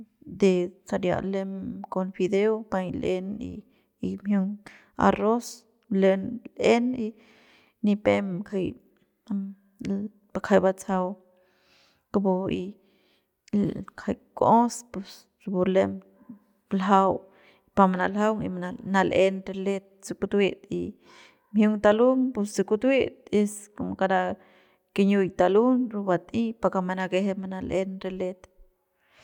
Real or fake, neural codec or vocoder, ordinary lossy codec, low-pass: real; none; none; 19.8 kHz